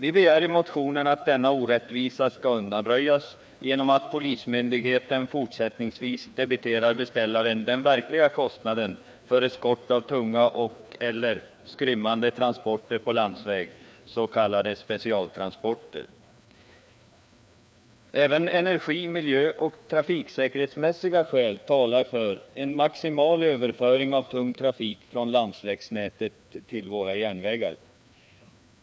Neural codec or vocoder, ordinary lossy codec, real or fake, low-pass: codec, 16 kHz, 2 kbps, FreqCodec, larger model; none; fake; none